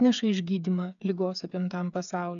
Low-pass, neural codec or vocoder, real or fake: 7.2 kHz; codec, 16 kHz, 8 kbps, FreqCodec, smaller model; fake